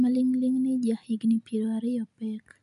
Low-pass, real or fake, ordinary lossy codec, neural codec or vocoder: 10.8 kHz; real; none; none